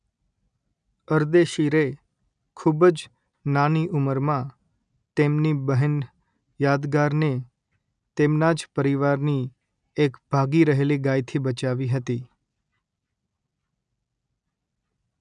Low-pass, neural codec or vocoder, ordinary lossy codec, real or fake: 9.9 kHz; none; none; real